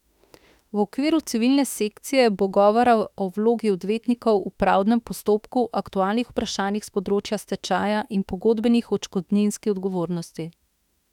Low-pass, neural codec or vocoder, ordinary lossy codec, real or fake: 19.8 kHz; autoencoder, 48 kHz, 32 numbers a frame, DAC-VAE, trained on Japanese speech; none; fake